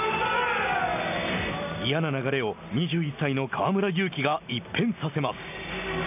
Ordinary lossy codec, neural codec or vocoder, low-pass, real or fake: none; none; 3.6 kHz; real